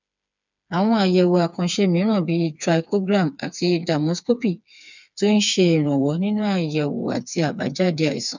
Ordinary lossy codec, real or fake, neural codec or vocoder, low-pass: none; fake; codec, 16 kHz, 4 kbps, FreqCodec, smaller model; 7.2 kHz